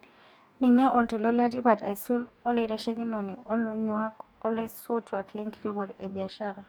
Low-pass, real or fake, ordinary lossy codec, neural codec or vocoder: none; fake; none; codec, 44.1 kHz, 2.6 kbps, DAC